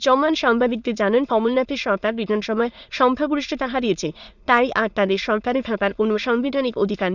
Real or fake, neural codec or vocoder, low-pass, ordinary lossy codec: fake; autoencoder, 22.05 kHz, a latent of 192 numbers a frame, VITS, trained on many speakers; 7.2 kHz; none